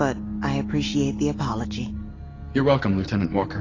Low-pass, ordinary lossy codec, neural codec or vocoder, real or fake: 7.2 kHz; AAC, 32 kbps; none; real